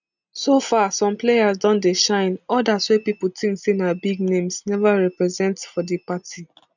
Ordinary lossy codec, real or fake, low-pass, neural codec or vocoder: none; real; 7.2 kHz; none